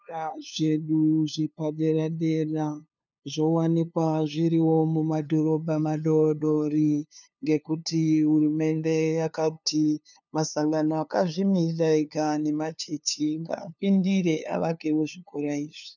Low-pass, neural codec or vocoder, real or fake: 7.2 kHz; codec, 16 kHz, 2 kbps, FunCodec, trained on LibriTTS, 25 frames a second; fake